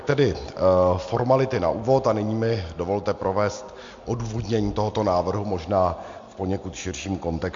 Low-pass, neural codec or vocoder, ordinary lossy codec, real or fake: 7.2 kHz; none; MP3, 48 kbps; real